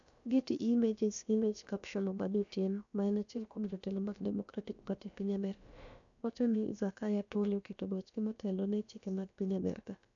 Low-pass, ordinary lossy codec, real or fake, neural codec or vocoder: 7.2 kHz; none; fake; codec, 16 kHz, about 1 kbps, DyCAST, with the encoder's durations